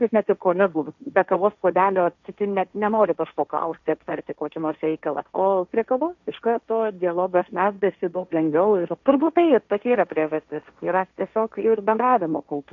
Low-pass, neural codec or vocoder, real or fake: 7.2 kHz; codec, 16 kHz, 1.1 kbps, Voila-Tokenizer; fake